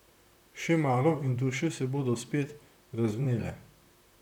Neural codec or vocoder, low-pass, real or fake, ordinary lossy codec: vocoder, 44.1 kHz, 128 mel bands, Pupu-Vocoder; 19.8 kHz; fake; none